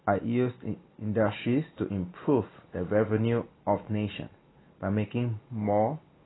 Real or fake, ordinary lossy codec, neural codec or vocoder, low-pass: real; AAC, 16 kbps; none; 7.2 kHz